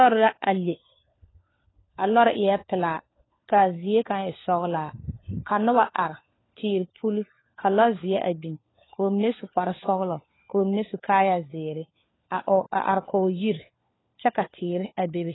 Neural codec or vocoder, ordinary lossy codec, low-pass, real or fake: codec, 16 kHz, 4 kbps, FunCodec, trained on LibriTTS, 50 frames a second; AAC, 16 kbps; 7.2 kHz; fake